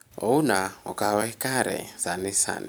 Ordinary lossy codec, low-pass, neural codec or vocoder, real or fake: none; none; vocoder, 44.1 kHz, 128 mel bands every 256 samples, BigVGAN v2; fake